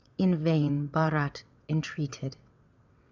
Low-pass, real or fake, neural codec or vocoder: 7.2 kHz; fake; vocoder, 22.05 kHz, 80 mel bands, WaveNeXt